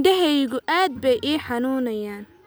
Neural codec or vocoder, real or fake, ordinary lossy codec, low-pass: none; real; none; none